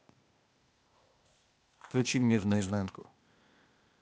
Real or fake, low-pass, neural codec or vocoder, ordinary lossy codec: fake; none; codec, 16 kHz, 0.8 kbps, ZipCodec; none